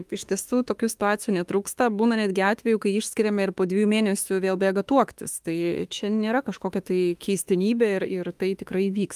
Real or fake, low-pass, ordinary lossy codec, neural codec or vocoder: fake; 14.4 kHz; Opus, 32 kbps; autoencoder, 48 kHz, 32 numbers a frame, DAC-VAE, trained on Japanese speech